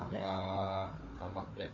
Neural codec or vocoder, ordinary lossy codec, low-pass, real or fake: codec, 16 kHz, 4 kbps, FunCodec, trained on Chinese and English, 50 frames a second; MP3, 32 kbps; 7.2 kHz; fake